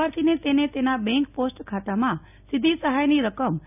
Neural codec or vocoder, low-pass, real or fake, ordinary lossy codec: none; 3.6 kHz; real; none